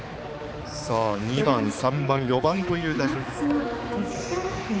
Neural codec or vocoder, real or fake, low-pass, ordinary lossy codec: codec, 16 kHz, 4 kbps, X-Codec, HuBERT features, trained on balanced general audio; fake; none; none